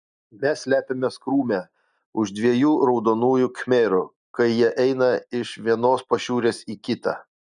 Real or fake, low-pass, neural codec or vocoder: real; 9.9 kHz; none